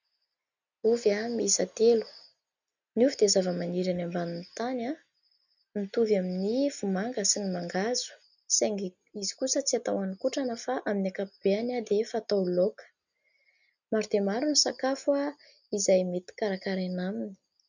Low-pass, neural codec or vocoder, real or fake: 7.2 kHz; none; real